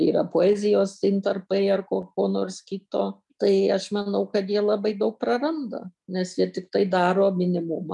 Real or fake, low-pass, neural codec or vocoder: real; 10.8 kHz; none